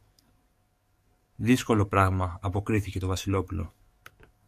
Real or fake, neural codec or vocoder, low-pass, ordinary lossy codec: fake; codec, 44.1 kHz, 7.8 kbps, DAC; 14.4 kHz; MP3, 64 kbps